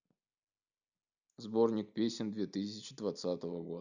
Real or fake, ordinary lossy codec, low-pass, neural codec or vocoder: real; none; 7.2 kHz; none